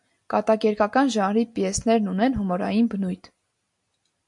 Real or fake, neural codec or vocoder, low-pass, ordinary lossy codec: real; none; 10.8 kHz; AAC, 64 kbps